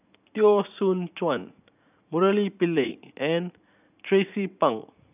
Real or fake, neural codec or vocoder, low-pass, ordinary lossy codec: fake; vocoder, 44.1 kHz, 80 mel bands, Vocos; 3.6 kHz; none